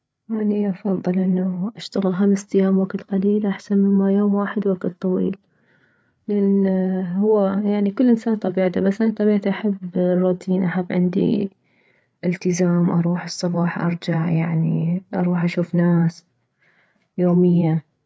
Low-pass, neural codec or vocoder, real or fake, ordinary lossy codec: none; codec, 16 kHz, 8 kbps, FreqCodec, larger model; fake; none